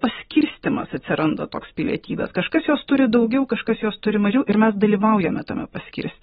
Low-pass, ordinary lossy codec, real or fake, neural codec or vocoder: 19.8 kHz; AAC, 16 kbps; real; none